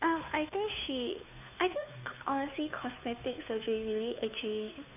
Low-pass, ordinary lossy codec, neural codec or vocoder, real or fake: 3.6 kHz; none; codec, 16 kHz, 2 kbps, FunCodec, trained on Chinese and English, 25 frames a second; fake